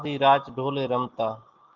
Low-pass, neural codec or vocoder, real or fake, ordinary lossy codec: 7.2 kHz; none; real; Opus, 32 kbps